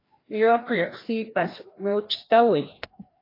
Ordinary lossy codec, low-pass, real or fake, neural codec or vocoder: AAC, 24 kbps; 5.4 kHz; fake; codec, 16 kHz, 1 kbps, FreqCodec, larger model